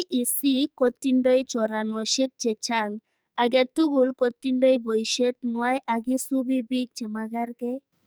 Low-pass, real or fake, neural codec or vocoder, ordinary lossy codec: none; fake; codec, 44.1 kHz, 2.6 kbps, SNAC; none